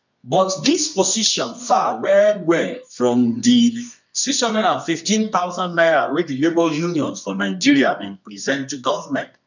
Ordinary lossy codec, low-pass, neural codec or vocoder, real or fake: none; 7.2 kHz; codec, 24 kHz, 0.9 kbps, WavTokenizer, medium music audio release; fake